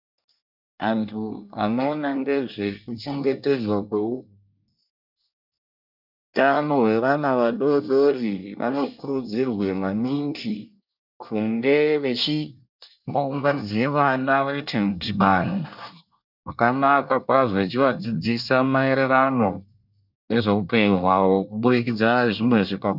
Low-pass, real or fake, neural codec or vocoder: 5.4 kHz; fake; codec, 24 kHz, 1 kbps, SNAC